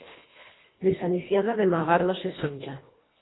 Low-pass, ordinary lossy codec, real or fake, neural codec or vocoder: 7.2 kHz; AAC, 16 kbps; fake; codec, 24 kHz, 1.5 kbps, HILCodec